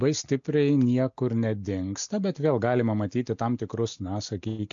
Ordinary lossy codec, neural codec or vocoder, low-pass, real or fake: AAC, 64 kbps; none; 7.2 kHz; real